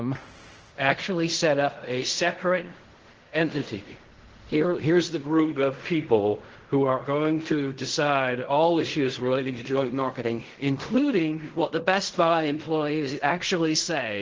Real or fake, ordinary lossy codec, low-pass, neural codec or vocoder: fake; Opus, 16 kbps; 7.2 kHz; codec, 16 kHz in and 24 kHz out, 0.4 kbps, LongCat-Audio-Codec, fine tuned four codebook decoder